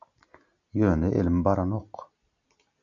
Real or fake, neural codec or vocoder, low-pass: real; none; 7.2 kHz